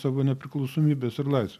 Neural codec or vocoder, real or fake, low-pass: none; real; 14.4 kHz